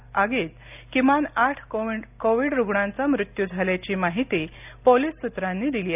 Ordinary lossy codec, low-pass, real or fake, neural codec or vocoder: none; 3.6 kHz; real; none